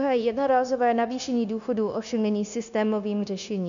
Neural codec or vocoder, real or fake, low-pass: codec, 16 kHz, 0.9 kbps, LongCat-Audio-Codec; fake; 7.2 kHz